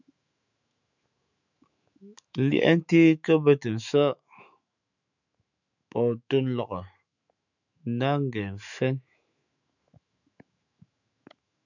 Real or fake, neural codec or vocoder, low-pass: fake; autoencoder, 48 kHz, 128 numbers a frame, DAC-VAE, trained on Japanese speech; 7.2 kHz